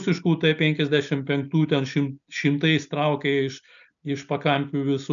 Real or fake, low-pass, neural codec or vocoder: real; 7.2 kHz; none